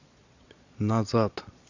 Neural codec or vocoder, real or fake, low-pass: vocoder, 22.05 kHz, 80 mel bands, Vocos; fake; 7.2 kHz